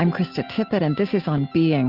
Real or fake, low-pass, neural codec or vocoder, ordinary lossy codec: real; 5.4 kHz; none; Opus, 24 kbps